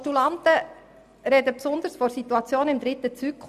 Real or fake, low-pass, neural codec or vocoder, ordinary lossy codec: real; 14.4 kHz; none; none